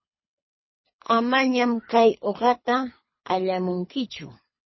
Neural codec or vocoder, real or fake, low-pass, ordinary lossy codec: codec, 24 kHz, 3 kbps, HILCodec; fake; 7.2 kHz; MP3, 24 kbps